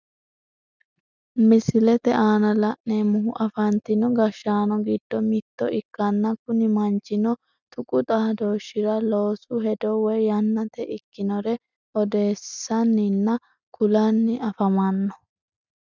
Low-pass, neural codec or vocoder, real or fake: 7.2 kHz; none; real